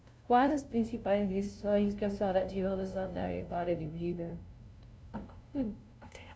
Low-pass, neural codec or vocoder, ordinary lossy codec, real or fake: none; codec, 16 kHz, 0.5 kbps, FunCodec, trained on LibriTTS, 25 frames a second; none; fake